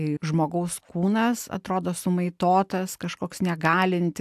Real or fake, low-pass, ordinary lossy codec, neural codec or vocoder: real; 14.4 kHz; AAC, 96 kbps; none